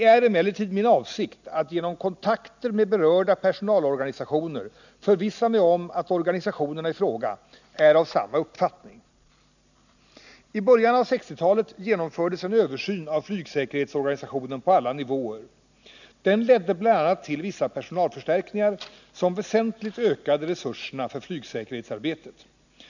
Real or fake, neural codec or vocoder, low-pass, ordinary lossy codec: real; none; 7.2 kHz; none